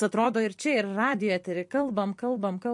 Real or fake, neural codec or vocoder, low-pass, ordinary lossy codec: fake; vocoder, 44.1 kHz, 128 mel bands every 256 samples, BigVGAN v2; 10.8 kHz; MP3, 48 kbps